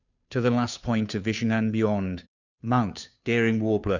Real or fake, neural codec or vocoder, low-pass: fake; codec, 16 kHz, 2 kbps, FunCodec, trained on Chinese and English, 25 frames a second; 7.2 kHz